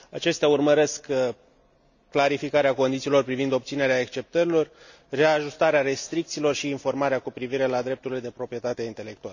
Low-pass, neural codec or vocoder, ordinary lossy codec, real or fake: 7.2 kHz; none; none; real